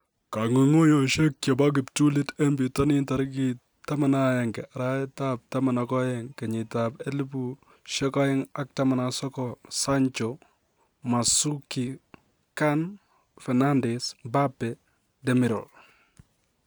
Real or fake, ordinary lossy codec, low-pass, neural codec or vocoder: real; none; none; none